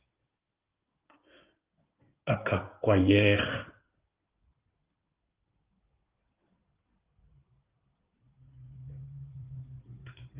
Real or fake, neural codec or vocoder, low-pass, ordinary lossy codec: fake; codec, 16 kHz in and 24 kHz out, 2.2 kbps, FireRedTTS-2 codec; 3.6 kHz; Opus, 32 kbps